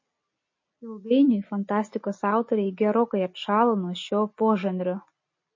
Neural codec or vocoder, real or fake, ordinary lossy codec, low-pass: none; real; MP3, 32 kbps; 7.2 kHz